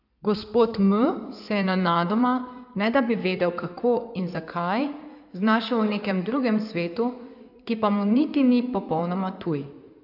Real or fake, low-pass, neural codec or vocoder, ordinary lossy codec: fake; 5.4 kHz; codec, 16 kHz in and 24 kHz out, 2.2 kbps, FireRedTTS-2 codec; none